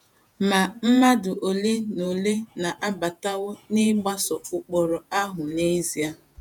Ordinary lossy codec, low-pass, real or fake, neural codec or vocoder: none; 19.8 kHz; fake; vocoder, 48 kHz, 128 mel bands, Vocos